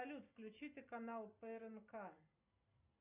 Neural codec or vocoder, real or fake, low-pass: none; real; 3.6 kHz